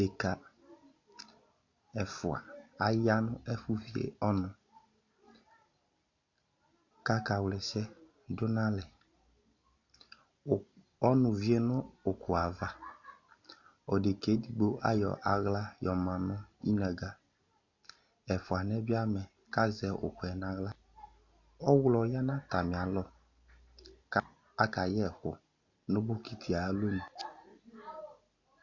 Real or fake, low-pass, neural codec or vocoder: real; 7.2 kHz; none